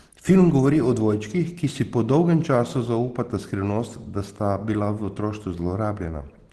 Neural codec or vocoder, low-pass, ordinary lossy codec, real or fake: none; 10.8 kHz; Opus, 16 kbps; real